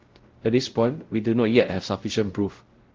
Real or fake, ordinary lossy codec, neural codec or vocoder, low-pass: fake; Opus, 16 kbps; codec, 16 kHz, 0.5 kbps, X-Codec, WavLM features, trained on Multilingual LibriSpeech; 7.2 kHz